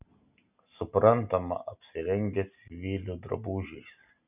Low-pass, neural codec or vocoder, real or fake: 3.6 kHz; none; real